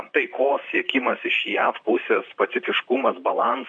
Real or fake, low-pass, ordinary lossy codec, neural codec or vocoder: fake; 9.9 kHz; MP3, 64 kbps; vocoder, 44.1 kHz, 128 mel bands, Pupu-Vocoder